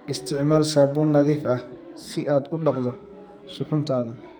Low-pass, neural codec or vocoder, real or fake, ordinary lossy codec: none; codec, 44.1 kHz, 2.6 kbps, SNAC; fake; none